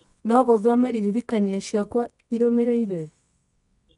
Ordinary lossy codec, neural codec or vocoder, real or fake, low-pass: none; codec, 24 kHz, 0.9 kbps, WavTokenizer, medium music audio release; fake; 10.8 kHz